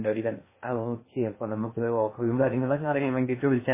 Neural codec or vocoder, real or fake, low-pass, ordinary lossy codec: codec, 16 kHz in and 24 kHz out, 0.6 kbps, FocalCodec, streaming, 2048 codes; fake; 3.6 kHz; MP3, 16 kbps